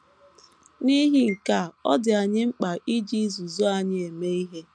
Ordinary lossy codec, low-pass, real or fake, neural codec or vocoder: none; none; real; none